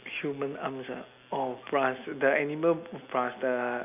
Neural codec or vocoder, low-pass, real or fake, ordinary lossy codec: none; 3.6 kHz; real; none